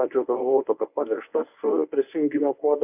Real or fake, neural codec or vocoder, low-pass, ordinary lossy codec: fake; codec, 24 kHz, 0.9 kbps, WavTokenizer, medium speech release version 1; 3.6 kHz; AAC, 32 kbps